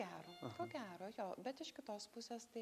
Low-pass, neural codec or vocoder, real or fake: 14.4 kHz; none; real